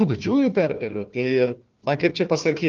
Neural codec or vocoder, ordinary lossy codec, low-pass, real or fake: codec, 16 kHz, 1 kbps, FunCodec, trained on Chinese and English, 50 frames a second; Opus, 24 kbps; 7.2 kHz; fake